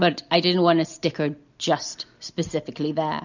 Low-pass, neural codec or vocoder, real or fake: 7.2 kHz; none; real